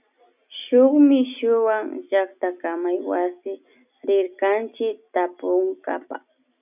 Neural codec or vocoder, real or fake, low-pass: none; real; 3.6 kHz